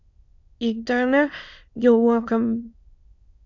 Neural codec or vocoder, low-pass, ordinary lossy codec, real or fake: autoencoder, 22.05 kHz, a latent of 192 numbers a frame, VITS, trained on many speakers; 7.2 kHz; Opus, 64 kbps; fake